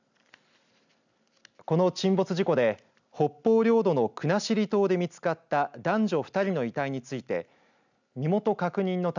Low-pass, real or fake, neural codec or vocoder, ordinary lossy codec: 7.2 kHz; real; none; none